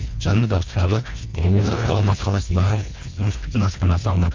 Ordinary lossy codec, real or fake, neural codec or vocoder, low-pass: MP3, 48 kbps; fake; codec, 24 kHz, 1.5 kbps, HILCodec; 7.2 kHz